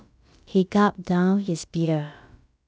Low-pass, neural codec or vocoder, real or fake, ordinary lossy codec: none; codec, 16 kHz, about 1 kbps, DyCAST, with the encoder's durations; fake; none